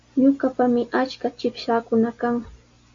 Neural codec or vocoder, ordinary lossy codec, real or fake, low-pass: none; MP3, 48 kbps; real; 7.2 kHz